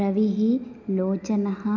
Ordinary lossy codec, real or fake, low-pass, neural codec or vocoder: none; real; 7.2 kHz; none